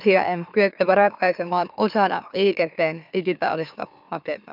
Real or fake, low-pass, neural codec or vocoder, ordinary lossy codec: fake; 5.4 kHz; autoencoder, 44.1 kHz, a latent of 192 numbers a frame, MeloTTS; none